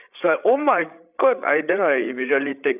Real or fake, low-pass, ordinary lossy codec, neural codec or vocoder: fake; 3.6 kHz; none; codec, 16 kHz, 8 kbps, FreqCodec, larger model